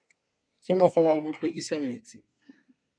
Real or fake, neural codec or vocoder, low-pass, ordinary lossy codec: fake; codec, 24 kHz, 1 kbps, SNAC; 9.9 kHz; MP3, 96 kbps